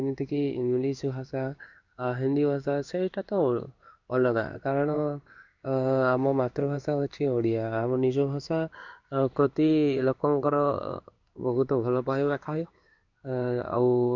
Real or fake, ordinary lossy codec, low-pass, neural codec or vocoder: fake; none; 7.2 kHz; codec, 16 kHz in and 24 kHz out, 1 kbps, XY-Tokenizer